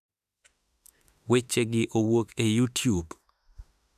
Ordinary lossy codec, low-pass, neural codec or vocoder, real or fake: none; 14.4 kHz; autoencoder, 48 kHz, 32 numbers a frame, DAC-VAE, trained on Japanese speech; fake